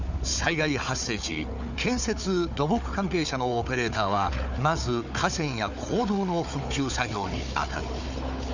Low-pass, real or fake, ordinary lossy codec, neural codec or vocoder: 7.2 kHz; fake; none; codec, 16 kHz, 4 kbps, FunCodec, trained on Chinese and English, 50 frames a second